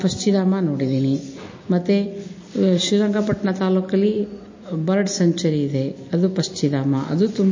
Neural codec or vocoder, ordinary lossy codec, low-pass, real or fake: none; MP3, 32 kbps; 7.2 kHz; real